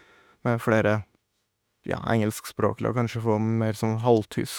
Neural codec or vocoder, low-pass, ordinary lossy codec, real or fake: autoencoder, 48 kHz, 32 numbers a frame, DAC-VAE, trained on Japanese speech; none; none; fake